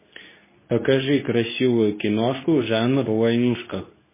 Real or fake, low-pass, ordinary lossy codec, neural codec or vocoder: fake; 3.6 kHz; MP3, 16 kbps; codec, 24 kHz, 0.9 kbps, WavTokenizer, medium speech release version 2